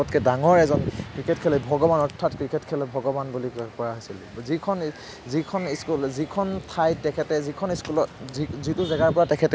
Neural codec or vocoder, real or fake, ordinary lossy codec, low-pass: none; real; none; none